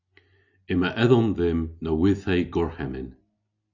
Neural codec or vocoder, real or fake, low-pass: none; real; 7.2 kHz